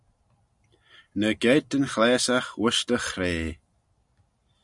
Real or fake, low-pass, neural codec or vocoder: real; 10.8 kHz; none